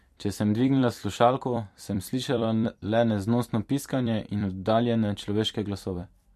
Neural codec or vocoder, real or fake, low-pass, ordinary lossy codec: vocoder, 44.1 kHz, 128 mel bands every 256 samples, BigVGAN v2; fake; 14.4 kHz; MP3, 64 kbps